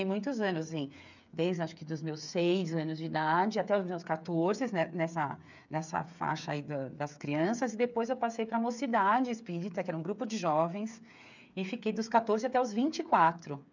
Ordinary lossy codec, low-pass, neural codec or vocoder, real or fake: none; 7.2 kHz; codec, 16 kHz, 8 kbps, FreqCodec, smaller model; fake